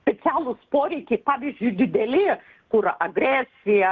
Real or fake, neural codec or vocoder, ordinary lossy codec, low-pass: real; none; Opus, 16 kbps; 7.2 kHz